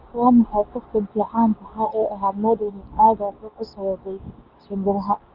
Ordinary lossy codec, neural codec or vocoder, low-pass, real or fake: Opus, 32 kbps; codec, 24 kHz, 0.9 kbps, WavTokenizer, medium speech release version 2; 5.4 kHz; fake